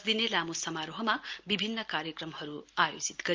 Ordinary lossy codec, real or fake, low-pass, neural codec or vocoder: Opus, 24 kbps; real; 7.2 kHz; none